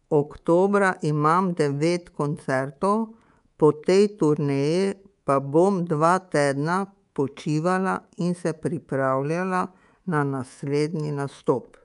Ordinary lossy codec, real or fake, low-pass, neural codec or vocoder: MP3, 96 kbps; fake; 10.8 kHz; codec, 24 kHz, 3.1 kbps, DualCodec